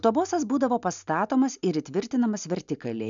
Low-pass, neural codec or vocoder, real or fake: 7.2 kHz; none; real